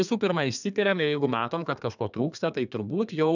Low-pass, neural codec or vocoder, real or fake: 7.2 kHz; codec, 32 kHz, 1.9 kbps, SNAC; fake